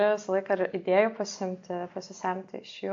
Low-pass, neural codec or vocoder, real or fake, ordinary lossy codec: 7.2 kHz; none; real; AAC, 48 kbps